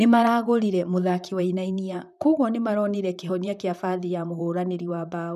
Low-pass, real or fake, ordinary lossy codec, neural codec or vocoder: 14.4 kHz; fake; none; vocoder, 44.1 kHz, 128 mel bands, Pupu-Vocoder